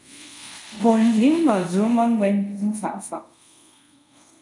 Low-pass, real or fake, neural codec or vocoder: 10.8 kHz; fake; codec, 24 kHz, 0.5 kbps, DualCodec